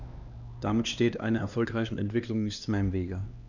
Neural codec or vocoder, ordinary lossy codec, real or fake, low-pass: codec, 16 kHz, 2 kbps, X-Codec, HuBERT features, trained on LibriSpeech; none; fake; 7.2 kHz